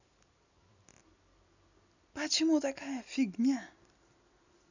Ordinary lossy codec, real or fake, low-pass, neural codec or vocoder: none; real; 7.2 kHz; none